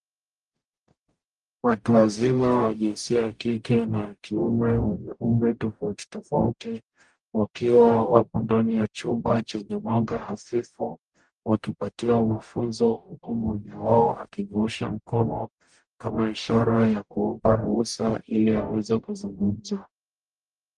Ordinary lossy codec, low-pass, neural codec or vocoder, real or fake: Opus, 32 kbps; 10.8 kHz; codec, 44.1 kHz, 0.9 kbps, DAC; fake